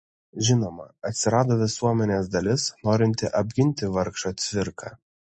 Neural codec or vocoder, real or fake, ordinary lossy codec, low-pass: none; real; MP3, 32 kbps; 10.8 kHz